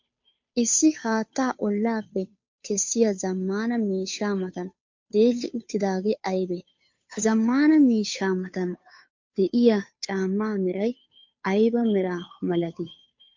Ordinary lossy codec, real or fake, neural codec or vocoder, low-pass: MP3, 48 kbps; fake; codec, 16 kHz, 2 kbps, FunCodec, trained on Chinese and English, 25 frames a second; 7.2 kHz